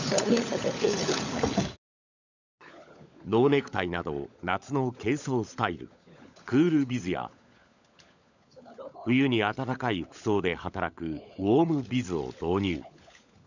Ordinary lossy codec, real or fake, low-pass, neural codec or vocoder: none; fake; 7.2 kHz; codec, 16 kHz, 16 kbps, FunCodec, trained on LibriTTS, 50 frames a second